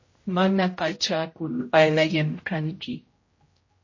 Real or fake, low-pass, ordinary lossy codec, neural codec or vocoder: fake; 7.2 kHz; MP3, 32 kbps; codec, 16 kHz, 0.5 kbps, X-Codec, HuBERT features, trained on general audio